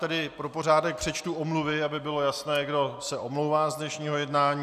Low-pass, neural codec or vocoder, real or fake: 14.4 kHz; none; real